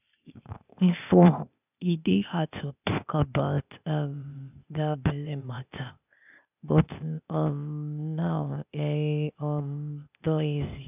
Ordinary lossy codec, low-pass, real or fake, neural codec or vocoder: none; 3.6 kHz; fake; codec, 16 kHz, 0.8 kbps, ZipCodec